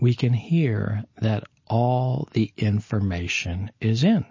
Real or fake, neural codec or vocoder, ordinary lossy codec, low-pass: real; none; MP3, 32 kbps; 7.2 kHz